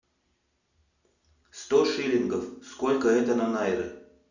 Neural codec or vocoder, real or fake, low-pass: none; real; 7.2 kHz